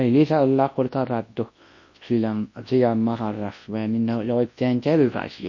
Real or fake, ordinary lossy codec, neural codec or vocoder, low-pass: fake; MP3, 32 kbps; codec, 24 kHz, 0.9 kbps, WavTokenizer, large speech release; 7.2 kHz